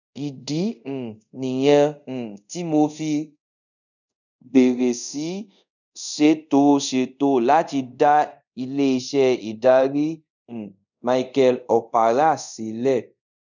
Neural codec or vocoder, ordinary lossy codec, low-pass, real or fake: codec, 24 kHz, 0.5 kbps, DualCodec; none; 7.2 kHz; fake